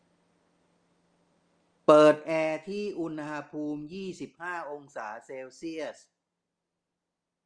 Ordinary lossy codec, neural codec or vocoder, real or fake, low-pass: Opus, 32 kbps; none; real; 9.9 kHz